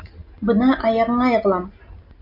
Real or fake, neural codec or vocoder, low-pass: real; none; 5.4 kHz